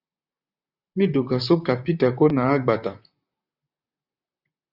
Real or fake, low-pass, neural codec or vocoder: fake; 5.4 kHz; vocoder, 44.1 kHz, 128 mel bands, Pupu-Vocoder